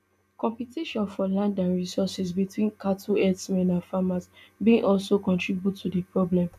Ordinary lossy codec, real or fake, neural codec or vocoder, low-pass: none; real; none; 14.4 kHz